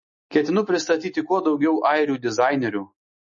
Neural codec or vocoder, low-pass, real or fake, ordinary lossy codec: none; 7.2 kHz; real; MP3, 32 kbps